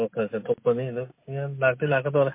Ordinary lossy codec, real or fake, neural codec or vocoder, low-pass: MP3, 24 kbps; real; none; 3.6 kHz